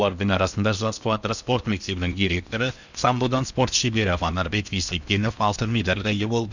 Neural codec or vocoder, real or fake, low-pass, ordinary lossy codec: codec, 16 kHz in and 24 kHz out, 0.8 kbps, FocalCodec, streaming, 65536 codes; fake; 7.2 kHz; none